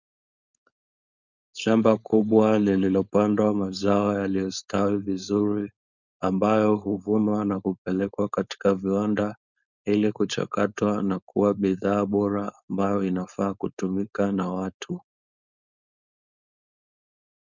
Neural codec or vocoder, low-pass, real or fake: codec, 16 kHz, 4.8 kbps, FACodec; 7.2 kHz; fake